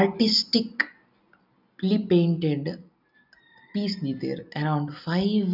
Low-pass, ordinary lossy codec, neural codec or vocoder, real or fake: 5.4 kHz; none; none; real